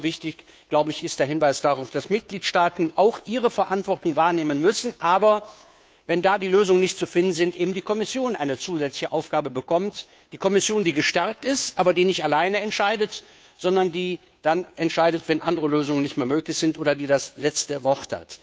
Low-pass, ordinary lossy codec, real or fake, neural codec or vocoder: none; none; fake; codec, 16 kHz, 2 kbps, FunCodec, trained on Chinese and English, 25 frames a second